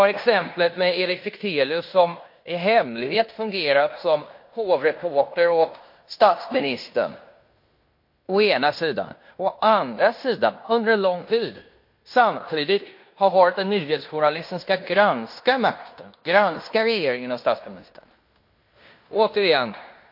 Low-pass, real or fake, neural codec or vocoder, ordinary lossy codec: 5.4 kHz; fake; codec, 16 kHz in and 24 kHz out, 0.9 kbps, LongCat-Audio-Codec, fine tuned four codebook decoder; MP3, 32 kbps